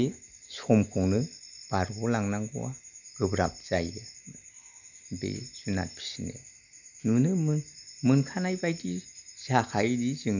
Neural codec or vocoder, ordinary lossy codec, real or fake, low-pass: none; none; real; 7.2 kHz